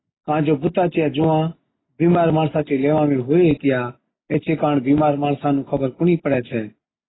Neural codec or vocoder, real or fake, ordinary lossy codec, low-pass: none; real; AAC, 16 kbps; 7.2 kHz